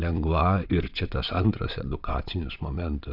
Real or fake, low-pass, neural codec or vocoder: fake; 5.4 kHz; vocoder, 22.05 kHz, 80 mel bands, WaveNeXt